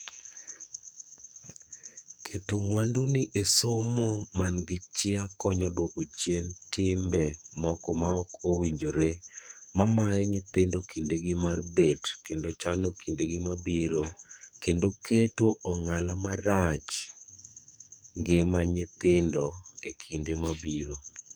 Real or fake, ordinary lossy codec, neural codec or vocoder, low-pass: fake; none; codec, 44.1 kHz, 2.6 kbps, SNAC; none